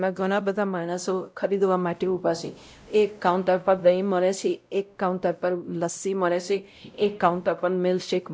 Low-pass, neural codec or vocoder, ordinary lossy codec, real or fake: none; codec, 16 kHz, 0.5 kbps, X-Codec, WavLM features, trained on Multilingual LibriSpeech; none; fake